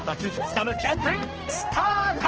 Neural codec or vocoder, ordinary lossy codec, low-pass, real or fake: codec, 16 kHz, 1 kbps, X-Codec, HuBERT features, trained on balanced general audio; Opus, 16 kbps; 7.2 kHz; fake